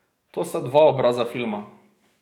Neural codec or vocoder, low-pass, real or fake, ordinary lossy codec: codec, 44.1 kHz, 7.8 kbps, DAC; 19.8 kHz; fake; none